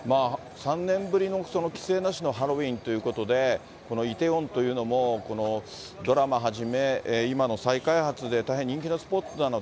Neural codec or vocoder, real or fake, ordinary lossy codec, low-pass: none; real; none; none